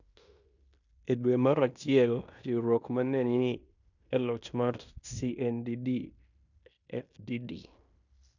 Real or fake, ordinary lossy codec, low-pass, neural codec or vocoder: fake; none; 7.2 kHz; codec, 16 kHz in and 24 kHz out, 0.9 kbps, LongCat-Audio-Codec, fine tuned four codebook decoder